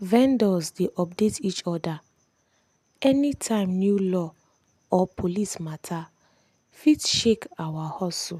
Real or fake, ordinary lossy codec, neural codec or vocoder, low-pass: real; MP3, 96 kbps; none; 14.4 kHz